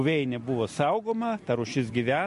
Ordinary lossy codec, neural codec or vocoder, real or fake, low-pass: MP3, 48 kbps; none; real; 14.4 kHz